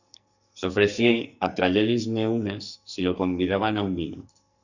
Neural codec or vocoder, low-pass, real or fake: codec, 44.1 kHz, 2.6 kbps, SNAC; 7.2 kHz; fake